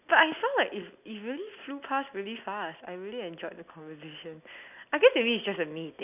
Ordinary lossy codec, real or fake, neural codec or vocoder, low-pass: none; real; none; 3.6 kHz